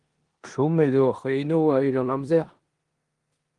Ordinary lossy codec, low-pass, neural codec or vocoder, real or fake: Opus, 24 kbps; 10.8 kHz; codec, 16 kHz in and 24 kHz out, 0.9 kbps, LongCat-Audio-Codec, four codebook decoder; fake